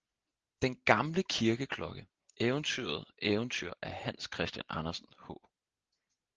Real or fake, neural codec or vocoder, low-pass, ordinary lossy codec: real; none; 7.2 kHz; Opus, 16 kbps